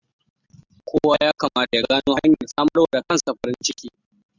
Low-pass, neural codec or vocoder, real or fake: 7.2 kHz; none; real